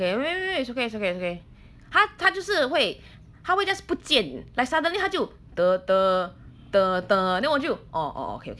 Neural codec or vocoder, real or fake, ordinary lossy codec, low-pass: none; real; none; none